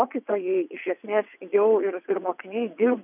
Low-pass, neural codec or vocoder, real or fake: 3.6 kHz; vocoder, 22.05 kHz, 80 mel bands, WaveNeXt; fake